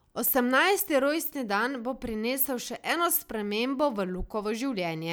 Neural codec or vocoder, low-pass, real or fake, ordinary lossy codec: none; none; real; none